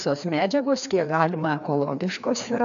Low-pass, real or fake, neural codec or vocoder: 7.2 kHz; fake; codec, 16 kHz, 2 kbps, FreqCodec, larger model